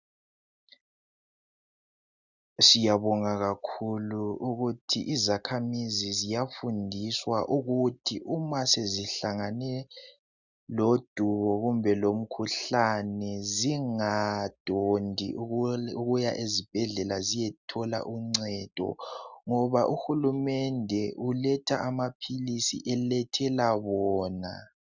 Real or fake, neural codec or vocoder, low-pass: real; none; 7.2 kHz